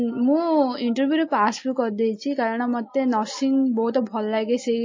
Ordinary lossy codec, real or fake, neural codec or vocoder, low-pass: MP3, 32 kbps; real; none; 7.2 kHz